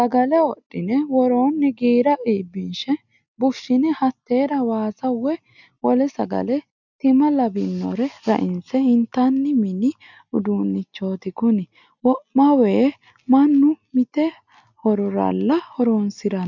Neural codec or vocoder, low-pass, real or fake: none; 7.2 kHz; real